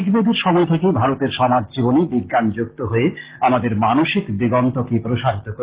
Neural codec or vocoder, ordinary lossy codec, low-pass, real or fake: none; Opus, 16 kbps; 3.6 kHz; real